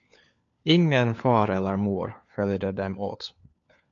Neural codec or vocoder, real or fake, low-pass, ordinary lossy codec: codec, 16 kHz, 4 kbps, FunCodec, trained on LibriTTS, 50 frames a second; fake; 7.2 kHz; AAC, 64 kbps